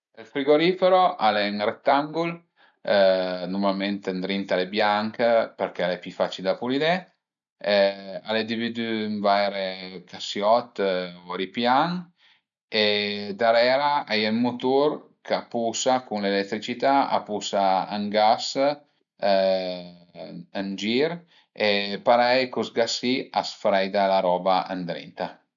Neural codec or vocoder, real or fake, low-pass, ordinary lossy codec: none; real; 7.2 kHz; none